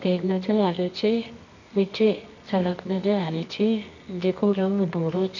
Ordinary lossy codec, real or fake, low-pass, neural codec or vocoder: none; fake; 7.2 kHz; codec, 24 kHz, 0.9 kbps, WavTokenizer, medium music audio release